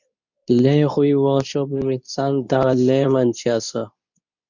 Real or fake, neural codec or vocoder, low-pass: fake; codec, 24 kHz, 0.9 kbps, WavTokenizer, medium speech release version 2; 7.2 kHz